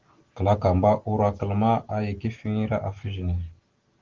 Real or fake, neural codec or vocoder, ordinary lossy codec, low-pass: real; none; Opus, 16 kbps; 7.2 kHz